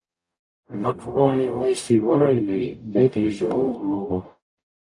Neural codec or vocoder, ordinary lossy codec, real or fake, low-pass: codec, 44.1 kHz, 0.9 kbps, DAC; AAC, 64 kbps; fake; 10.8 kHz